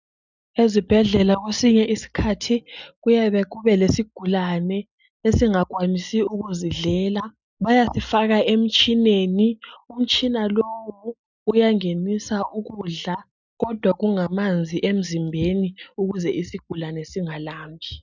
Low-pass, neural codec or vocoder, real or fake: 7.2 kHz; none; real